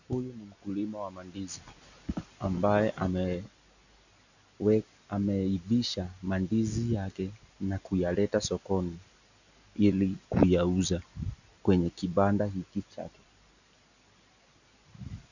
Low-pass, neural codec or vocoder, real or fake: 7.2 kHz; none; real